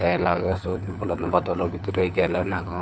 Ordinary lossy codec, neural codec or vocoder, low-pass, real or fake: none; codec, 16 kHz, 4 kbps, FunCodec, trained on LibriTTS, 50 frames a second; none; fake